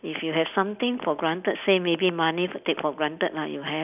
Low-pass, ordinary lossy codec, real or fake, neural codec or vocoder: 3.6 kHz; none; real; none